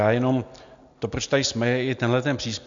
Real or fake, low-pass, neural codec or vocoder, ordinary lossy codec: real; 7.2 kHz; none; MP3, 64 kbps